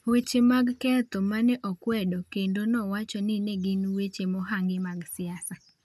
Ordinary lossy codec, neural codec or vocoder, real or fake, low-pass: none; none; real; 14.4 kHz